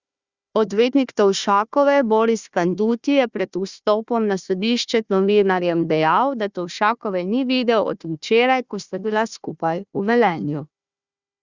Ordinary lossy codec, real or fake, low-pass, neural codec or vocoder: Opus, 64 kbps; fake; 7.2 kHz; codec, 16 kHz, 1 kbps, FunCodec, trained on Chinese and English, 50 frames a second